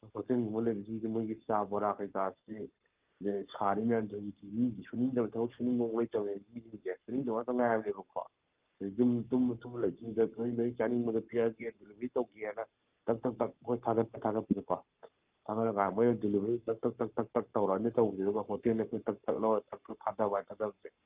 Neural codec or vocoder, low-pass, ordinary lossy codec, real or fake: codec, 44.1 kHz, 7.8 kbps, Pupu-Codec; 3.6 kHz; Opus, 24 kbps; fake